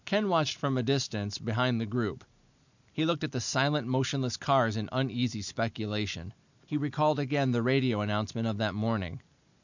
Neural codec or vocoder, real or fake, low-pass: none; real; 7.2 kHz